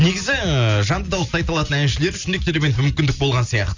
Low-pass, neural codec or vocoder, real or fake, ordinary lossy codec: 7.2 kHz; none; real; Opus, 64 kbps